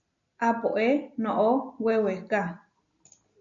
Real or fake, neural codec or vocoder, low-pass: real; none; 7.2 kHz